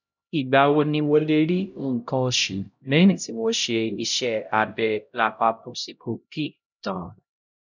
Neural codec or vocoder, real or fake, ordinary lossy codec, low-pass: codec, 16 kHz, 0.5 kbps, X-Codec, HuBERT features, trained on LibriSpeech; fake; none; 7.2 kHz